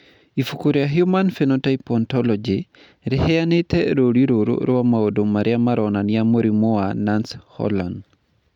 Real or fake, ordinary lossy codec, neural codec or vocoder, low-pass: real; none; none; 19.8 kHz